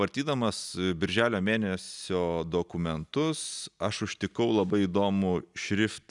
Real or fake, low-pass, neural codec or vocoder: real; 10.8 kHz; none